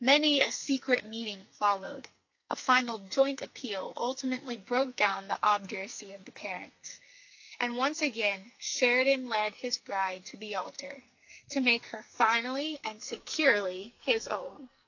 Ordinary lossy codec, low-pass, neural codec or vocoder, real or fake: AAC, 48 kbps; 7.2 kHz; codec, 44.1 kHz, 2.6 kbps, SNAC; fake